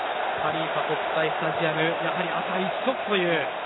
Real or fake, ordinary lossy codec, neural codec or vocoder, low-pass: real; AAC, 16 kbps; none; 7.2 kHz